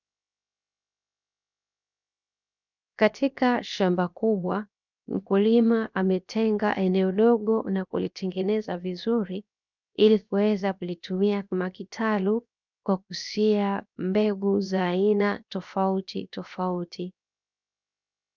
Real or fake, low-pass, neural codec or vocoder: fake; 7.2 kHz; codec, 16 kHz, 0.7 kbps, FocalCodec